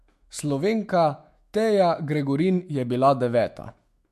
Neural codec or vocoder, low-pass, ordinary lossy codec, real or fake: autoencoder, 48 kHz, 128 numbers a frame, DAC-VAE, trained on Japanese speech; 14.4 kHz; MP3, 64 kbps; fake